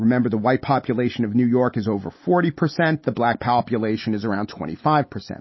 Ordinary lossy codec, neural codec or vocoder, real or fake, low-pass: MP3, 24 kbps; none; real; 7.2 kHz